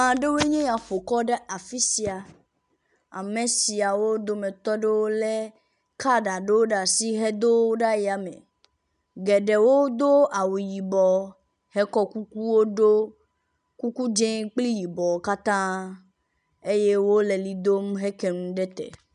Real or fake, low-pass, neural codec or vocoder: real; 10.8 kHz; none